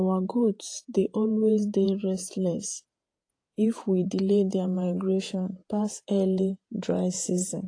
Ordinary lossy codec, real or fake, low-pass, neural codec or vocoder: AAC, 48 kbps; fake; 9.9 kHz; vocoder, 48 kHz, 128 mel bands, Vocos